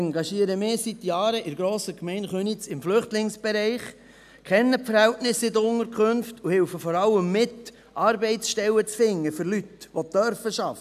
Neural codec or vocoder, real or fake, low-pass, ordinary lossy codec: none; real; 14.4 kHz; none